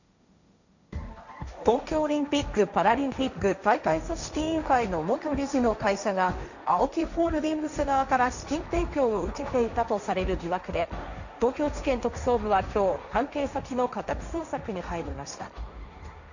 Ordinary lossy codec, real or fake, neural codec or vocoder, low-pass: none; fake; codec, 16 kHz, 1.1 kbps, Voila-Tokenizer; 7.2 kHz